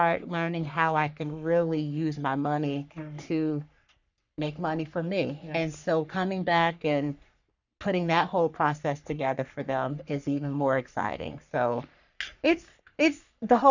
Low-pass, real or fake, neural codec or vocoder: 7.2 kHz; fake; codec, 44.1 kHz, 3.4 kbps, Pupu-Codec